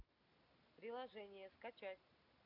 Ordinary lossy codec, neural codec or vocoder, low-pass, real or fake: none; none; 5.4 kHz; real